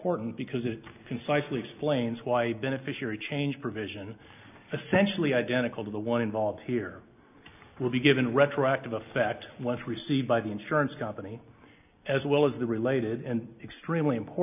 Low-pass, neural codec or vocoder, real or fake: 3.6 kHz; none; real